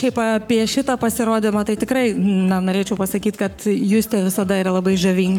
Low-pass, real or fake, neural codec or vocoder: 19.8 kHz; fake; codec, 44.1 kHz, 7.8 kbps, Pupu-Codec